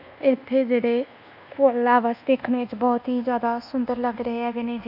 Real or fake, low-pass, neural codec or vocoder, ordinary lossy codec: fake; 5.4 kHz; codec, 24 kHz, 1.2 kbps, DualCodec; none